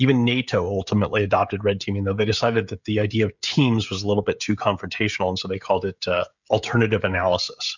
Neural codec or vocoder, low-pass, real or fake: codec, 16 kHz, 16 kbps, FreqCodec, smaller model; 7.2 kHz; fake